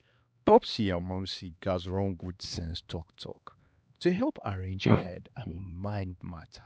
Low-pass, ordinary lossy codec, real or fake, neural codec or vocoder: none; none; fake; codec, 16 kHz, 2 kbps, X-Codec, HuBERT features, trained on LibriSpeech